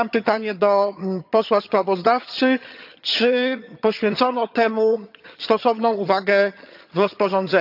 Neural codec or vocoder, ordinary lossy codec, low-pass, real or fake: vocoder, 22.05 kHz, 80 mel bands, HiFi-GAN; AAC, 48 kbps; 5.4 kHz; fake